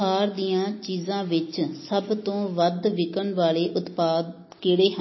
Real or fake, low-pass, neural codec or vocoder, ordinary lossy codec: real; 7.2 kHz; none; MP3, 24 kbps